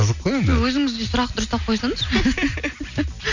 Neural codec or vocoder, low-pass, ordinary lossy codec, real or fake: none; 7.2 kHz; none; real